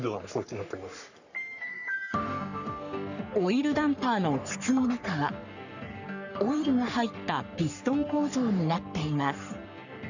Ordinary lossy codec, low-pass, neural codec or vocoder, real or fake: none; 7.2 kHz; codec, 44.1 kHz, 3.4 kbps, Pupu-Codec; fake